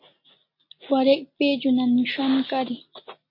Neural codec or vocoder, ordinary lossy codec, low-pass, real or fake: none; MP3, 32 kbps; 5.4 kHz; real